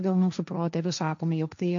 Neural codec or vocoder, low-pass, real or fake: codec, 16 kHz, 1.1 kbps, Voila-Tokenizer; 7.2 kHz; fake